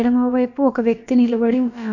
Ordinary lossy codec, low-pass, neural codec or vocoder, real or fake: none; 7.2 kHz; codec, 16 kHz, about 1 kbps, DyCAST, with the encoder's durations; fake